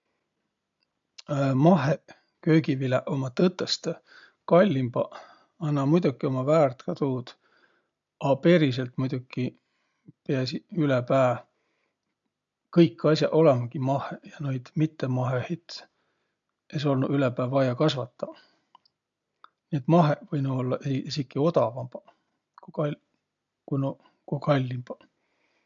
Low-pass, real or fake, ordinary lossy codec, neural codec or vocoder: 7.2 kHz; real; MP3, 64 kbps; none